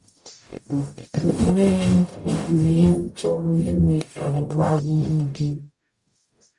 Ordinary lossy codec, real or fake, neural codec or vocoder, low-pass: Opus, 64 kbps; fake; codec, 44.1 kHz, 0.9 kbps, DAC; 10.8 kHz